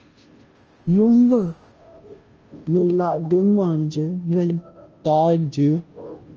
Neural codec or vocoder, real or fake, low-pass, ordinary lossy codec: codec, 16 kHz, 0.5 kbps, FunCodec, trained on Chinese and English, 25 frames a second; fake; 7.2 kHz; Opus, 24 kbps